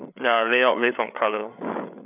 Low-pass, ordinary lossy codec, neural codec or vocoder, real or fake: 3.6 kHz; none; codec, 16 kHz, 8 kbps, FreqCodec, larger model; fake